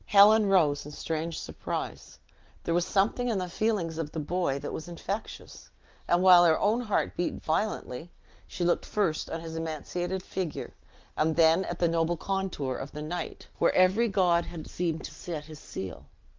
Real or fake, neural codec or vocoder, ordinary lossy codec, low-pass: real; none; Opus, 32 kbps; 7.2 kHz